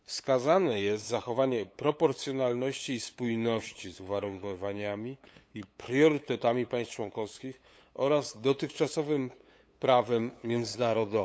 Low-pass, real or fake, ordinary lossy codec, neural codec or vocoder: none; fake; none; codec, 16 kHz, 8 kbps, FunCodec, trained on LibriTTS, 25 frames a second